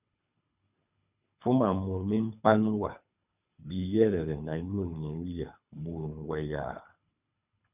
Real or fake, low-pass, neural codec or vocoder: fake; 3.6 kHz; codec, 24 kHz, 3 kbps, HILCodec